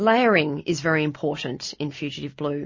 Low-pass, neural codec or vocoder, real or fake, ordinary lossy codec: 7.2 kHz; none; real; MP3, 32 kbps